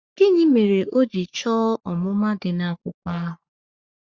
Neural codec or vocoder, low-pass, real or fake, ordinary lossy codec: codec, 44.1 kHz, 3.4 kbps, Pupu-Codec; 7.2 kHz; fake; Opus, 64 kbps